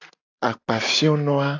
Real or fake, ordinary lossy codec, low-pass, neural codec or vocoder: real; AAC, 48 kbps; 7.2 kHz; none